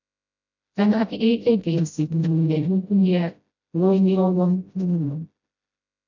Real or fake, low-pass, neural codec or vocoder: fake; 7.2 kHz; codec, 16 kHz, 0.5 kbps, FreqCodec, smaller model